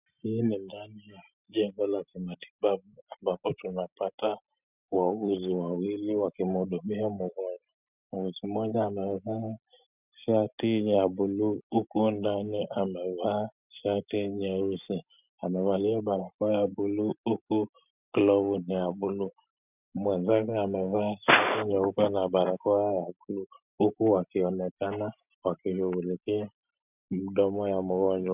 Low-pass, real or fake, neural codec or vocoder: 3.6 kHz; real; none